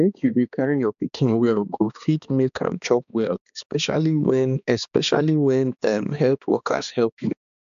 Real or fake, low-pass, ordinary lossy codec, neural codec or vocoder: fake; 7.2 kHz; AAC, 96 kbps; codec, 16 kHz, 2 kbps, X-Codec, HuBERT features, trained on balanced general audio